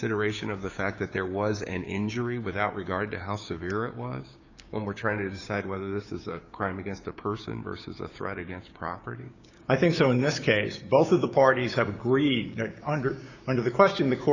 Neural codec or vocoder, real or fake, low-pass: codec, 16 kHz, 6 kbps, DAC; fake; 7.2 kHz